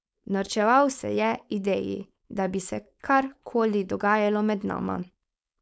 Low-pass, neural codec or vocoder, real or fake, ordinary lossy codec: none; codec, 16 kHz, 4.8 kbps, FACodec; fake; none